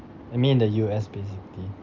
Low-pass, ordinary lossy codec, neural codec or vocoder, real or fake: 7.2 kHz; Opus, 24 kbps; none; real